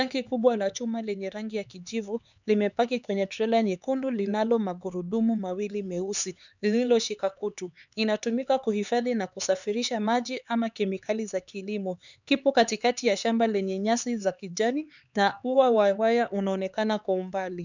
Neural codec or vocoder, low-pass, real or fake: codec, 16 kHz, 4 kbps, X-Codec, HuBERT features, trained on LibriSpeech; 7.2 kHz; fake